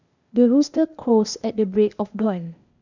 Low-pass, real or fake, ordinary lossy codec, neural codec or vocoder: 7.2 kHz; fake; none; codec, 16 kHz, 0.8 kbps, ZipCodec